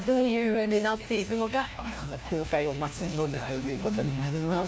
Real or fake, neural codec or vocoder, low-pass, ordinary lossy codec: fake; codec, 16 kHz, 1 kbps, FunCodec, trained on LibriTTS, 50 frames a second; none; none